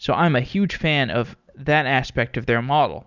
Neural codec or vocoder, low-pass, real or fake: none; 7.2 kHz; real